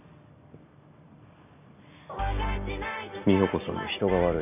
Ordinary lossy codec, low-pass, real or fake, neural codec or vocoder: none; 3.6 kHz; real; none